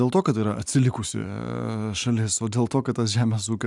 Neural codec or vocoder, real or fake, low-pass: none; real; 10.8 kHz